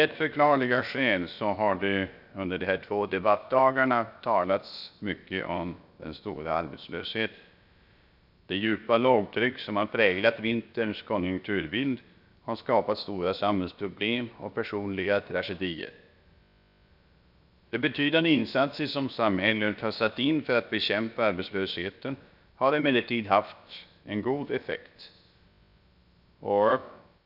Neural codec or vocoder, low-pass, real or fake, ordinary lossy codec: codec, 16 kHz, about 1 kbps, DyCAST, with the encoder's durations; 5.4 kHz; fake; none